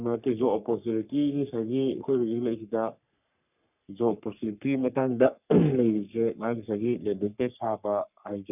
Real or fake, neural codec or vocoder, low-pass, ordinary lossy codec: fake; codec, 44.1 kHz, 3.4 kbps, Pupu-Codec; 3.6 kHz; none